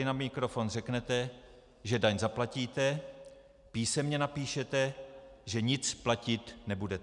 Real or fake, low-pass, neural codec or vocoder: real; 10.8 kHz; none